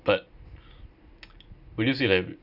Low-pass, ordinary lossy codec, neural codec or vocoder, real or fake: 5.4 kHz; none; none; real